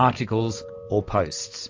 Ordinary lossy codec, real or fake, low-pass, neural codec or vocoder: AAC, 48 kbps; real; 7.2 kHz; none